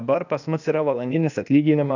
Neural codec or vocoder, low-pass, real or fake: codec, 16 kHz, 0.8 kbps, ZipCodec; 7.2 kHz; fake